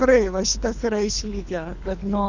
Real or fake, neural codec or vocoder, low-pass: fake; codec, 24 kHz, 3 kbps, HILCodec; 7.2 kHz